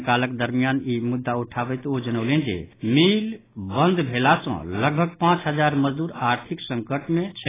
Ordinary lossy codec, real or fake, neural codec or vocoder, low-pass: AAC, 16 kbps; real; none; 3.6 kHz